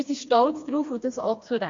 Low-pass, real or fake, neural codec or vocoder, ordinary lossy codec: 7.2 kHz; fake; codec, 16 kHz, 2 kbps, FreqCodec, smaller model; MP3, 48 kbps